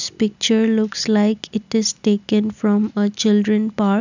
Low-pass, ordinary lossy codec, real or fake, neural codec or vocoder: 7.2 kHz; none; real; none